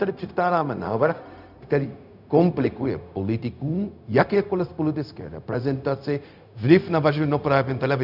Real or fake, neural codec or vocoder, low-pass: fake; codec, 16 kHz, 0.4 kbps, LongCat-Audio-Codec; 5.4 kHz